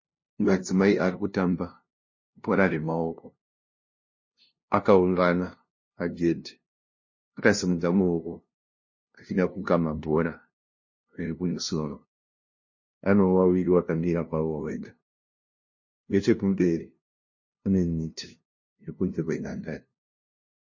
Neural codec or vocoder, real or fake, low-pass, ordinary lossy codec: codec, 16 kHz, 0.5 kbps, FunCodec, trained on LibriTTS, 25 frames a second; fake; 7.2 kHz; MP3, 32 kbps